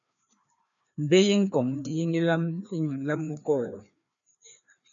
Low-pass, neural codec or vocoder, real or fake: 7.2 kHz; codec, 16 kHz, 2 kbps, FreqCodec, larger model; fake